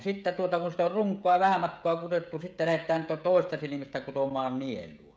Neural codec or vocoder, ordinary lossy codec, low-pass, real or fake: codec, 16 kHz, 16 kbps, FreqCodec, smaller model; none; none; fake